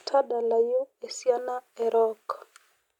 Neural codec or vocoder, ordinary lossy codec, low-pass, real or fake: none; none; 19.8 kHz; real